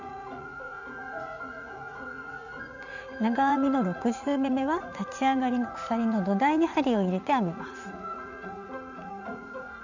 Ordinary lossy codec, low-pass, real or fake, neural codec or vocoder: none; 7.2 kHz; fake; vocoder, 44.1 kHz, 80 mel bands, Vocos